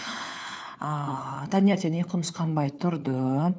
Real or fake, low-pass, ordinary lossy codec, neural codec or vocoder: fake; none; none; codec, 16 kHz, 4 kbps, FunCodec, trained on LibriTTS, 50 frames a second